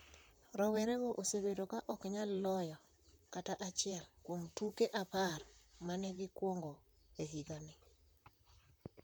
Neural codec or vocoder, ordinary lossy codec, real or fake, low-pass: vocoder, 44.1 kHz, 128 mel bands, Pupu-Vocoder; none; fake; none